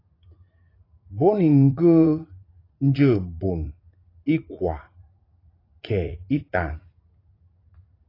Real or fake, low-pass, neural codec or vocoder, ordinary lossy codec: fake; 5.4 kHz; vocoder, 44.1 kHz, 80 mel bands, Vocos; AAC, 24 kbps